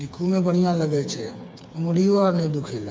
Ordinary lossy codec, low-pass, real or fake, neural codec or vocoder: none; none; fake; codec, 16 kHz, 4 kbps, FreqCodec, smaller model